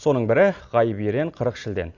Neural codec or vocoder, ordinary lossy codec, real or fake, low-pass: none; Opus, 64 kbps; real; 7.2 kHz